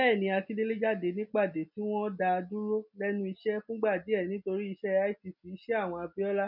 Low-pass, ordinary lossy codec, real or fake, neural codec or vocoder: 5.4 kHz; none; real; none